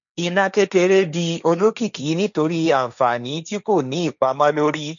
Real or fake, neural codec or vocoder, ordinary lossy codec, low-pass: fake; codec, 16 kHz, 1.1 kbps, Voila-Tokenizer; none; 7.2 kHz